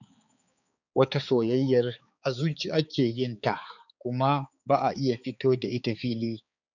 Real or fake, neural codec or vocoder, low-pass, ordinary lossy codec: fake; codec, 16 kHz, 4 kbps, X-Codec, HuBERT features, trained on balanced general audio; 7.2 kHz; none